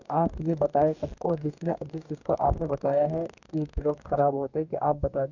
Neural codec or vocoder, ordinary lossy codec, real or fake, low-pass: codec, 44.1 kHz, 2.6 kbps, DAC; none; fake; 7.2 kHz